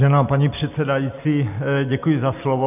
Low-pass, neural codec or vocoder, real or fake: 3.6 kHz; none; real